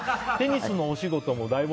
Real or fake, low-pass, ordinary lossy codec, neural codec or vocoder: real; none; none; none